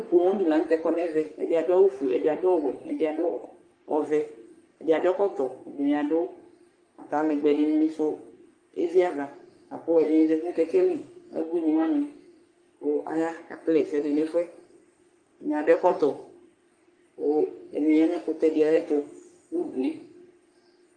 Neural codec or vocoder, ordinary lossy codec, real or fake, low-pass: codec, 44.1 kHz, 3.4 kbps, Pupu-Codec; Opus, 32 kbps; fake; 9.9 kHz